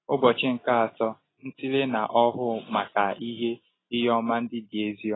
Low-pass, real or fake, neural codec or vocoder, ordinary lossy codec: 7.2 kHz; real; none; AAC, 16 kbps